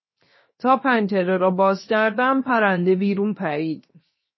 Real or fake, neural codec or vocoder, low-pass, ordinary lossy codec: fake; codec, 16 kHz, 0.7 kbps, FocalCodec; 7.2 kHz; MP3, 24 kbps